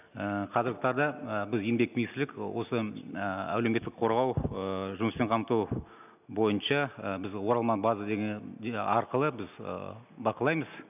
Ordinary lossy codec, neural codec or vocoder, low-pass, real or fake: none; none; 3.6 kHz; real